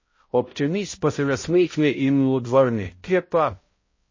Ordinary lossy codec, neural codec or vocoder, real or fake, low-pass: MP3, 32 kbps; codec, 16 kHz, 0.5 kbps, X-Codec, HuBERT features, trained on balanced general audio; fake; 7.2 kHz